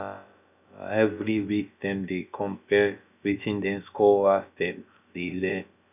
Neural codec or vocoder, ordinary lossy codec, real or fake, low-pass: codec, 16 kHz, about 1 kbps, DyCAST, with the encoder's durations; none; fake; 3.6 kHz